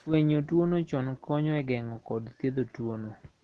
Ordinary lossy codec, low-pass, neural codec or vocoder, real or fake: Opus, 16 kbps; 10.8 kHz; none; real